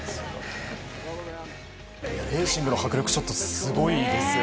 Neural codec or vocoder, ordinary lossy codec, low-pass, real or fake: none; none; none; real